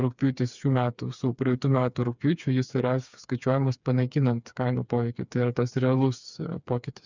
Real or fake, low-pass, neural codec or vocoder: fake; 7.2 kHz; codec, 16 kHz, 4 kbps, FreqCodec, smaller model